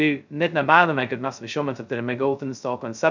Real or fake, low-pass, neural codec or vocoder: fake; 7.2 kHz; codec, 16 kHz, 0.2 kbps, FocalCodec